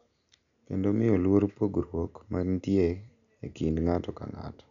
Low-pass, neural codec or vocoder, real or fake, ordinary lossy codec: 7.2 kHz; none; real; none